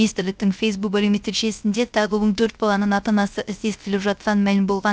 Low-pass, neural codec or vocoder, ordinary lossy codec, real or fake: none; codec, 16 kHz, 0.3 kbps, FocalCodec; none; fake